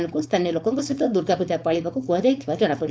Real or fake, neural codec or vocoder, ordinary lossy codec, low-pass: fake; codec, 16 kHz, 4.8 kbps, FACodec; none; none